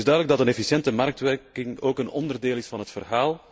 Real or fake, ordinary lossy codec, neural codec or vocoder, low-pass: real; none; none; none